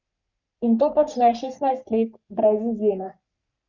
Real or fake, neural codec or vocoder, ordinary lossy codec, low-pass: fake; codec, 44.1 kHz, 3.4 kbps, Pupu-Codec; none; 7.2 kHz